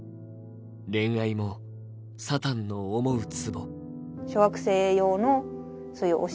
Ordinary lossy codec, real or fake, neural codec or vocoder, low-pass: none; real; none; none